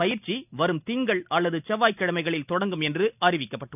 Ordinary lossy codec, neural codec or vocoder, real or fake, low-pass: none; none; real; 3.6 kHz